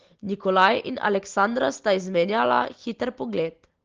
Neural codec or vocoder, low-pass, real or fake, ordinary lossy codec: none; 7.2 kHz; real; Opus, 16 kbps